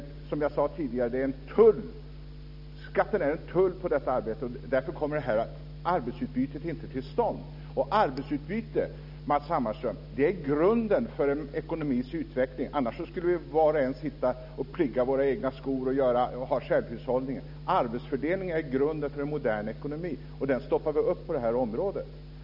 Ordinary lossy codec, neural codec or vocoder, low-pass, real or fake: none; none; 5.4 kHz; real